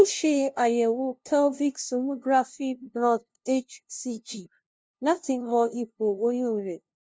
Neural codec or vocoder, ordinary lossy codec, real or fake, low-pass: codec, 16 kHz, 0.5 kbps, FunCodec, trained on LibriTTS, 25 frames a second; none; fake; none